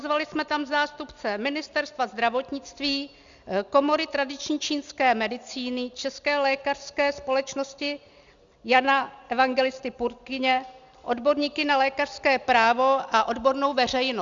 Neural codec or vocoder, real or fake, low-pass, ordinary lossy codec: none; real; 7.2 kHz; Opus, 64 kbps